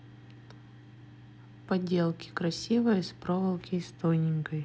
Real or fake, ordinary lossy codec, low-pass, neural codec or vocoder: real; none; none; none